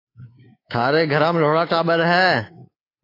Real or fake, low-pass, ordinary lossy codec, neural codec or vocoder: fake; 5.4 kHz; AAC, 24 kbps; codec, 24 kHz, 3.1 kbps, DualCodec